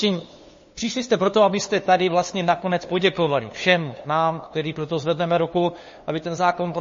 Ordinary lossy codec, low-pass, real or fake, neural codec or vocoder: MP3, 32 kbps; 7.2 kHz; fake; codec, 16 kHz, 2 kbps, FunCodec, trained on LibriTTS, 25 frames a second